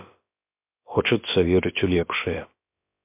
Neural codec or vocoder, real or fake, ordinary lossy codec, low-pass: codec, 16 kHz, about 1 kbps, DyCAST, with the encoder's durations; fake; MP3, 32 kbps; 3.6 kHz